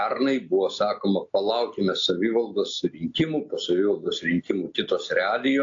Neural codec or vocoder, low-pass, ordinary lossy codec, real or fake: none; 7.2 kHz; AAC, 48 kbps; real